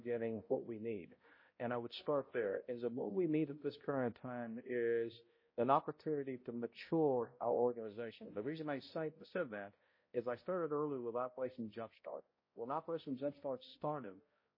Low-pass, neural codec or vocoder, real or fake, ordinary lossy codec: 5.4 kHz; codec, 16 kHz, 0.5 kbps, X-Codec, HuBERT features, trained on balanced general audio; fake; MP3, 24 kbps